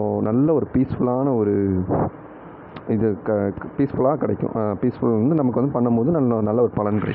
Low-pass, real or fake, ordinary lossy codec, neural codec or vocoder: 5.4 kHz; real; none; none